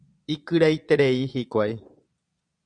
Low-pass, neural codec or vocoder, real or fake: 9.9 kHz; vocoder, 22.05 kHz, 80 mel bands, Vocos; fake